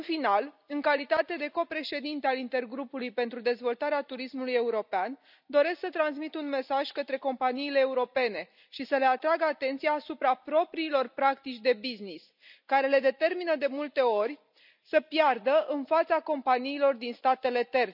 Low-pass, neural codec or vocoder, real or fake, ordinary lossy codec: 5.4 kHz; none; real; none